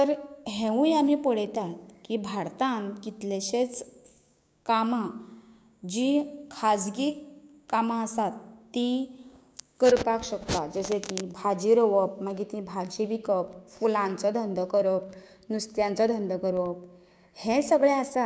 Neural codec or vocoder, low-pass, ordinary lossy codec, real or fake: codec, 16 kHz, 6 kbps, DAC; none; none; fake